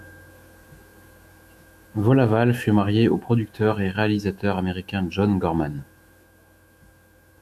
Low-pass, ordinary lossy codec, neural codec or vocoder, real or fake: 14.4 kHz; MP3, 96 kbps; autoencoder, 48 kHz, 128 numbers a frame, DAC-VAE, trained on Japanese speech; fake